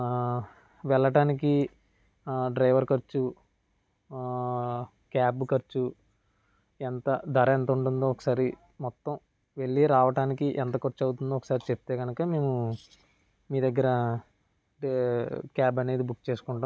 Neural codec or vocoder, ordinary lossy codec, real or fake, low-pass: none; none; real; none